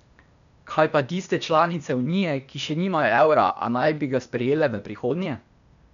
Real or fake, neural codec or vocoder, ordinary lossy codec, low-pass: fake; codec, 16 kHz, 0.8 kbps, ZipCodec; none; 7.2 kHz